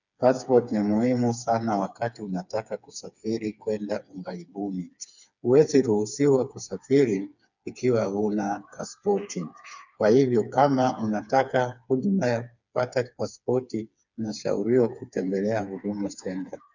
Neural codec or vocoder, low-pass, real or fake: codec, 16 kHz, 4 kbps, FreqCodec, smaller model; 7.2 kHz; fake